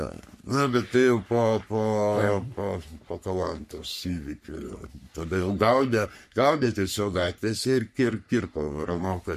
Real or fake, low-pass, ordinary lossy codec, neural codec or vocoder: fake; 14.4 kHz; MP3, 64 kbps; codec, 44.1 kHz, 3.4 kbps, Pupu-Codec